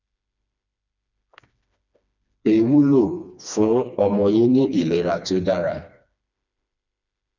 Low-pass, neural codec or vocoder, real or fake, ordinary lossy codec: 7.2 kHz; codec, 16 kHz, 2 kbps, FreqCodec, smaller model; fake; none